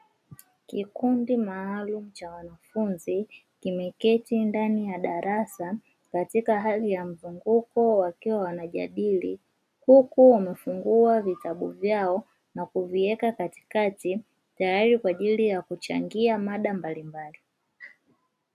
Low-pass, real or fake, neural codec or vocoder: 14.4 kHz; real; none